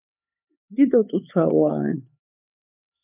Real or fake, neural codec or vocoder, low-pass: fake; codec, 16 kHz, 4 kbps, X-Codec, HuBERT features, trained on LibriSpeech; 3.6 kHz